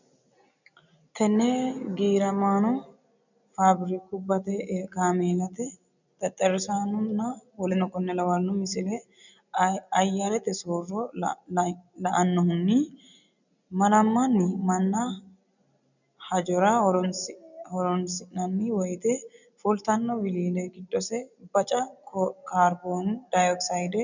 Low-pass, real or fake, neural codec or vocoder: 7.2 kHz; real; none